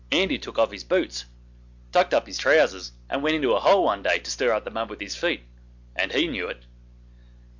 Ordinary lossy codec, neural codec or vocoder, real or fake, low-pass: MP3, 64 kbps; none; real; 7.2 kHz